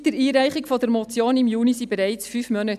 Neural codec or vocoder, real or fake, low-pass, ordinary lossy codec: none; real; 14.4 kHz; none